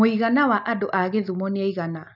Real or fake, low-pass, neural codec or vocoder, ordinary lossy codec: real; 5.4 kHz; none; none